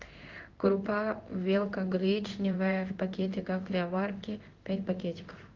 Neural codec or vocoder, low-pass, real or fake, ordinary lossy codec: codec, 16 kHz in and 24 kHz out, 1 kbps, XY-Tokenizer; 7.2 kHz; fake; Opus, 32 kbps